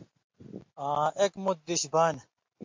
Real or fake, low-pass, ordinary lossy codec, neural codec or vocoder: real; 7.2 kHz; MP3, 48 kbps; none